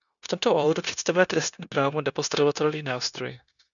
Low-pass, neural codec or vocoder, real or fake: 7.2 kHz; codec, 16 kHz, 0.9 kbps, LongCat-Audio-Codec; fake